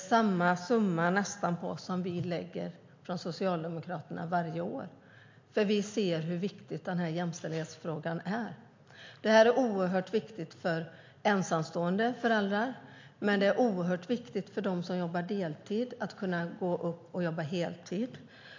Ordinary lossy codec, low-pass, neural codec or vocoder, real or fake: MP3, 48 kbps; 7.2 kHz; none; real